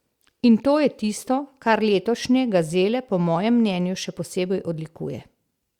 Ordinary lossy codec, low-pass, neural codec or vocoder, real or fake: Opus, 64 kbps; 19.8 kHz; none; real